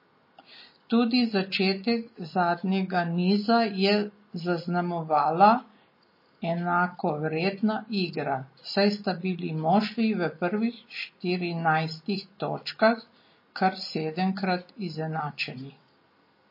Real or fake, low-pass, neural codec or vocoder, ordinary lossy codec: real; 5.4 kHz; none; MP3, 24 kbps